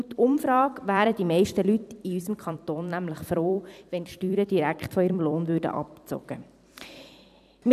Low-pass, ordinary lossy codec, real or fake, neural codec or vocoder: 14.4 kHz; none; fake; vocoder, 44.1 kHz, 128 mel bands every 256 samples, BigVGAN v2